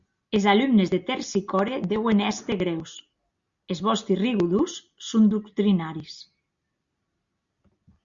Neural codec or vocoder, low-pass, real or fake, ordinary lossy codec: none; 7.2 kHz; real; Opus, 64 kbps